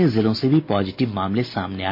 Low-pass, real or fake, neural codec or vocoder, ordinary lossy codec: 5.4 kHz; real; none; MP3, 32 kbps